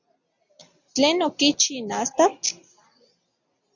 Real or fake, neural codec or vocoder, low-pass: real; none; 7.2 kHz